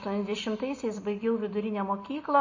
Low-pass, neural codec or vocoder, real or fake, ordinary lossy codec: 7.2 kHz; none; real; MP3, 32 kbps